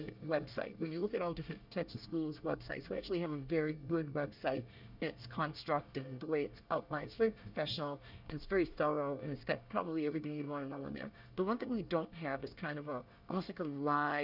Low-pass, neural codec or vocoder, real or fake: 5.4 kHz; codec, 24 kHz, 1 kbps, SNAC; fake